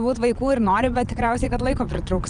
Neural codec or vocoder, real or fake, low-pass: vocoder, 22.05 kHz, 80 mel bands, Vocos; fake; 9.9 kHz